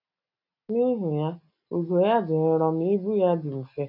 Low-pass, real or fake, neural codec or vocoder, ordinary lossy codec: 5.4 kHz; real; none; none